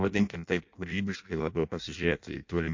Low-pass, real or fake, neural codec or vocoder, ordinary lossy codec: 7.2 kHz; fake; codec, 16 kHz in and 24 kHz out, 0.6 kbps, FireRedTTS-2 codec; MP3, 48 kbps